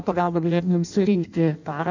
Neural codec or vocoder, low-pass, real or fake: codec, 16 kHz in and 24 kHz out, 0.6 kbps, FireRedTTS-2 codec; 7.2 kHz; fake